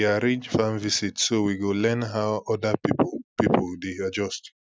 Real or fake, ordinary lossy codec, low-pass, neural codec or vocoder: real; none; none; none